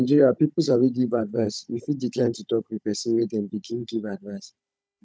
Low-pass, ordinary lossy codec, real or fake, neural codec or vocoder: none; none; fake; codec, 16 kHz, 16 kbps, FunCodec, trained on Chinese and English, 50 frames a second